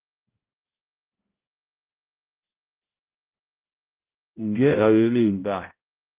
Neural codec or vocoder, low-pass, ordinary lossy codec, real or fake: codec, 16 kHz, 0.5 kbps, X-Codec, HuBERT features, trained on balanced general audio; 3.6 kHz; Opus, 32 kbps; fake